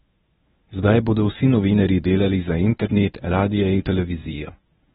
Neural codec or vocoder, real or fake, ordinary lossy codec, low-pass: codec, 24 kHz, 0.9 kbps, WavTokenizer, medium speech release version 1; fake; AAC, 16 kbps; 10.8 kHz